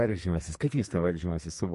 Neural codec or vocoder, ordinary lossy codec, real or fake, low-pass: codec, 44.1 kHz, 2.6 kbps, SNAC; MP3, 48 kbps; fake; 14.4 kHz